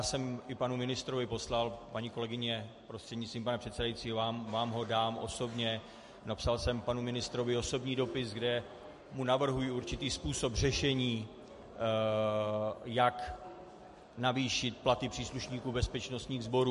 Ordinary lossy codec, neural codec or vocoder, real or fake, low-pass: MP3, 48 kbps; none; real; 14.4 kHz